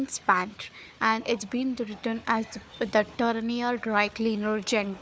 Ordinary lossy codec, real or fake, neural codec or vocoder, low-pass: none; fake; codec, 16 kHz, 4 kbps, FunCodec, trained on Chinese and English, 50 frames a second; none